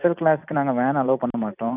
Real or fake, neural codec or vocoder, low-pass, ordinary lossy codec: fake; vocoder, 44.1 kHz, 128 mel bands every 256 samples, BigVGAN v2; 3.6 kHz; none